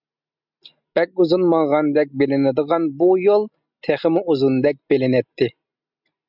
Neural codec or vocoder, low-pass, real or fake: none; 5.4 kHz; real